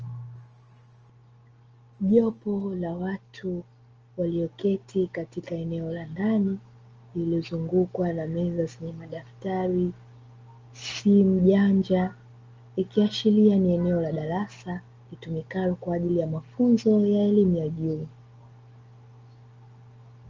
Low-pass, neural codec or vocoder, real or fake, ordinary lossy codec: 7.2 kHz; none; real; Opus, 24 kbps